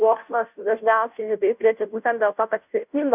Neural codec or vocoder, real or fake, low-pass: codec, 16 kHz, 0.5 kbps, FunCodec, trained on Chinese and English, 25 frames a second; fake; 3.6 kHz